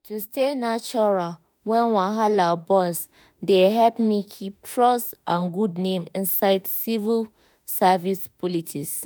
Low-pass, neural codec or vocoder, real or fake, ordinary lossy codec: none; autoencoder, 48 kHz, 32 numbers a frame, DAC-VAE, trained on Japanese speech; fake; none